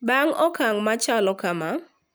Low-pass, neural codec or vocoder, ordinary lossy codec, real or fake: none; none; none; real